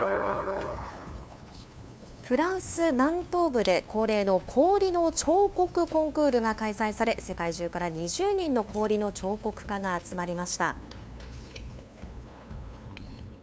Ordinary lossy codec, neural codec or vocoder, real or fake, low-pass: none; codec, 16 kHz, 2 kbps, FunCodec, trained on LibriTTS, 25 frames a second; fake; none